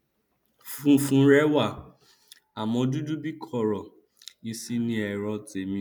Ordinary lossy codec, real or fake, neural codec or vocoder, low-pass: none; real; none; none